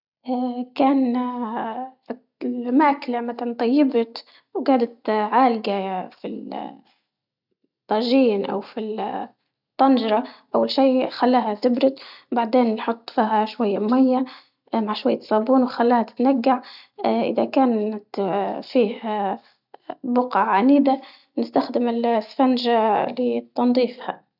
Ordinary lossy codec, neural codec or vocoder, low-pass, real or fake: none; none; 5.4 kHz; real